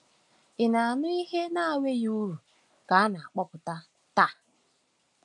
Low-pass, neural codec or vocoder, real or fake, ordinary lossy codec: 10.8 kHz; none; real; none